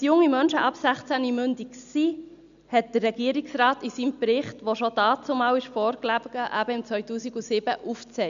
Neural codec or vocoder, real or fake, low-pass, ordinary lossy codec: none; real; 7.2 kHz; none